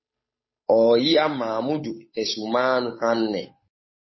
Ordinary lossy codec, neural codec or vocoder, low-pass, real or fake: MP3, 24 kbps; codec, 16 kHz, 8 kbps, FunCodec, trained on Chinese and English, 25 frames a second; 7.2 kHz; fake